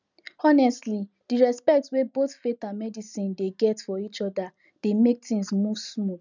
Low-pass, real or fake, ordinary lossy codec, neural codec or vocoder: 7.2 kHz; real; none; none